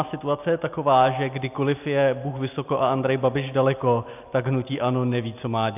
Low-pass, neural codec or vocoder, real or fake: 3.6 kHz; none; real